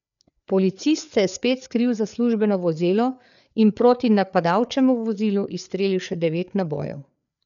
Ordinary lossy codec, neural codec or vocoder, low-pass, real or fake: none; codec, 16 kHz, 4 kbps, FreqCodec, larger model; 7.2 kHz; fake